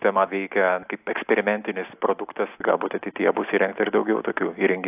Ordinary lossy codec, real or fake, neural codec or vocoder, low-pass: AAC, 32 kbps; real; none; 3.6 kHz